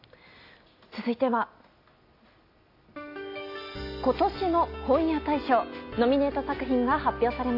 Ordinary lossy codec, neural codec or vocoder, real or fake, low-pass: AAC, 32 kbps; none; real; 5.4 kHz